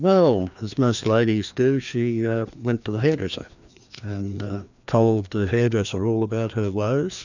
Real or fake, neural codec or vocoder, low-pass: fake; codec, 16 kHz, 2 kbps, FreqCodec, larger model; 7.2 kHz